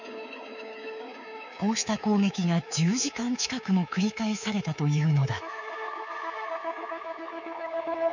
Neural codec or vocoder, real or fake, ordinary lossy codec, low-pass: codec, 24 kHz, 3.1 kbps, DualCodec; fake; none; 7.2 kHz